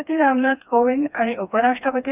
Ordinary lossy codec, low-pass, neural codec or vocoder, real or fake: none; 3.6 kHz; codec, 16 kHz, 2 kbps, FreqCodec, smaller model; fake